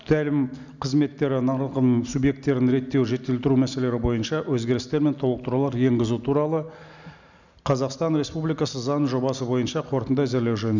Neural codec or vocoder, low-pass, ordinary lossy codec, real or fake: none; 7.2 kHz; none; real